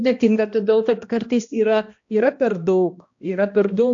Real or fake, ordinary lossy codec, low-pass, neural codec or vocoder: fake; AAC, 64 kbps; 7.2 kHz; codec, 16 kHz, 1 kbps, X-Codec, HuBERT features, trained on balanced general audio